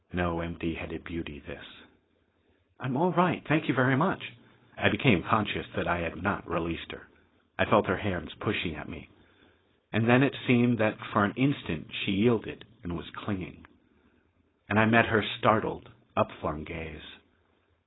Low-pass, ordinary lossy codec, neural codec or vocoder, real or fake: 7.2 kHz; AAC, 16 kbps; codec, 16 kHz, 4.8 kbps, FACodec; fake